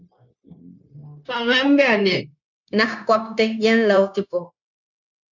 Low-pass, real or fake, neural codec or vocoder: 7.2 kHz; fake; codec, 16 kHz, 0.9 kbps, LongCat-Audio-Codec